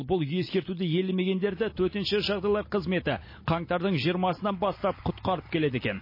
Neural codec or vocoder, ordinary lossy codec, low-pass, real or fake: none; MP3, 24 kbps; 5.4 kHz; real